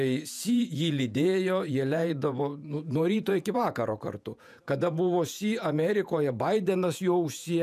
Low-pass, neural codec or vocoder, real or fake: 14.4 kHz; vocoder, 48 kHz, 128 mel bands, Vocos; fake